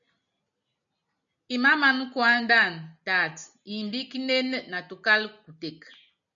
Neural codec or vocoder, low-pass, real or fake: none; 7.2 kHz; real